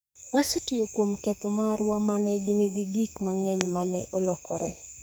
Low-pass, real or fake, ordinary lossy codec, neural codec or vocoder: none; fake; none; codec, 44.1 kHz, 2.6 kbps, SNAC